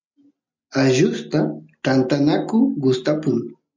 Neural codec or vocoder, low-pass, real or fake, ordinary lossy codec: none; 7.2 kHz; real; MP3, 64 kbps